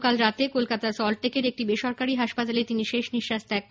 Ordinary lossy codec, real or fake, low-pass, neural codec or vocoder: none; real; none; none